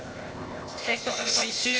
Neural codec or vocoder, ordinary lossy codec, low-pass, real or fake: codec, 16 kHz, 0.8 kbps, ZipCodec; none; none; fake